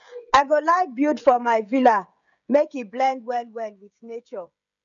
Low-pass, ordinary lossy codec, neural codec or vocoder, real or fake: 7.2 kHz; none; codec, 16 kHz, 16 kbps, FreqCodec, smaller model; fake